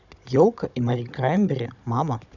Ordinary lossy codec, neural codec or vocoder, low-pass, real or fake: none; codec, 16 kHz, 16 kbps, FunCodec, trained on Chinese and English, 50 frames a second; 7.2 kHz; fake